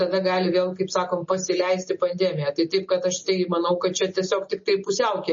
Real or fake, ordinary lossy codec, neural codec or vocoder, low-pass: real; MP3, 32 kbps; none; 7.2 kHz